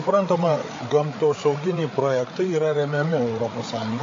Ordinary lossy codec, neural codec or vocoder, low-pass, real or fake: AAC, 32 kbps; codec, 16 kHz, 8 kbps, FreqCodec, larger model; 7.2 kHz; fake